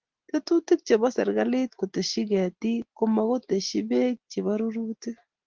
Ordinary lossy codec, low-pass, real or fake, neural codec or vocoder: Opus, 16 kbps; 7.2 kHz; real; none